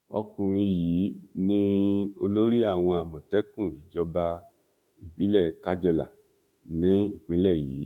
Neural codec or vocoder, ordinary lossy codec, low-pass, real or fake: autoencoder, 48 kHz, 32 numbers a frame, DAC-VAE, trained on Japanese speech; MP3, 96 kbps; 19.8 kHz; fake